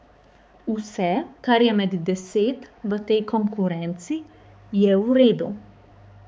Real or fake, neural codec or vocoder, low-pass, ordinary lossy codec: fake; codec, 16 kHz, 4 kbps, X-Codec, HuBERT features, trained on balanced general audio; none; none